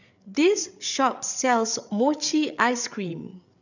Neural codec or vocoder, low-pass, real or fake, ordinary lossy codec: codec, 16 kHz, 8 kbps, FreqCodec, larger model; 7.2 kHz; fake; none